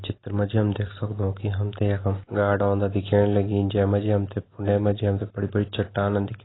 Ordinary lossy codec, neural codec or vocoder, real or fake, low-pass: AAC, 16 kbps; none; real; 7.2 kHz